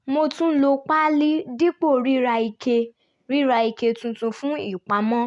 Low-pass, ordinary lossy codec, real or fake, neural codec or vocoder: 10.8 kHz; none; real; none